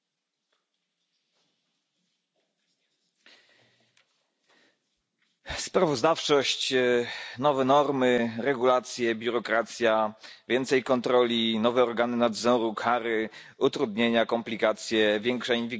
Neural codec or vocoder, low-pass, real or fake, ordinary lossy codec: none; none; real; none